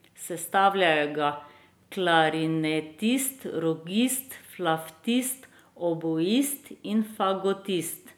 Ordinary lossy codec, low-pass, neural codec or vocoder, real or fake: none; none; none; real